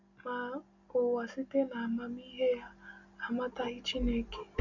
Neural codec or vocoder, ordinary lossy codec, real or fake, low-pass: none; none; real; 7.2 kHz